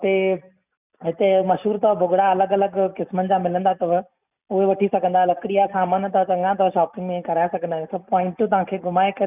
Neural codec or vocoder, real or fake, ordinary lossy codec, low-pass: none; real; none; 3.6 kHz